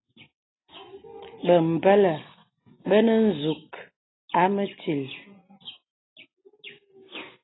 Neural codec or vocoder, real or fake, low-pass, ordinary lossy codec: none; real; 7.2 kHz; AAC, 16 kbps